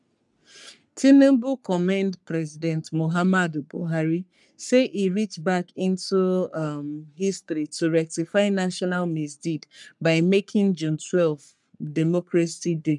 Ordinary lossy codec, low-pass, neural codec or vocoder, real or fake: none; 10.8 kHz; codec, 44.1 kHz, 3.4 kbps, Pupu-Codec; fake